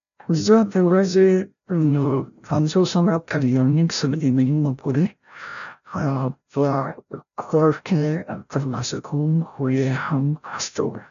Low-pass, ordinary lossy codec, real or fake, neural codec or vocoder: 7.2 kHz; none; fake; codec, 16 kHz, 0.5 kbps, FreqCodec, larger model